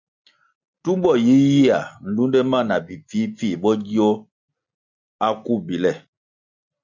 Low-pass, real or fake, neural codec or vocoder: 7.2 kHz; real; none